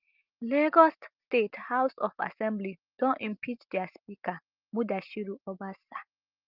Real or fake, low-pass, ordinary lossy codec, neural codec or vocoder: real; 5.4 kHz; Opus, 24 kbps; none